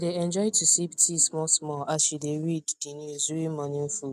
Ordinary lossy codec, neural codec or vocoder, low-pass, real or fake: none; none; 14.4 kHz; real